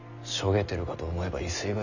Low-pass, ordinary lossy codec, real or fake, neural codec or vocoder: 7.2 kHz; none; real; none